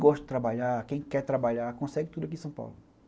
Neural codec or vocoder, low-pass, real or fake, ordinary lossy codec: none; none; real; none